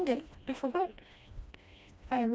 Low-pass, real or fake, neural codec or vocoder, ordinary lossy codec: none; fake; codec, 16 kHz, 1 kbps, FreqCodec, smaller model; none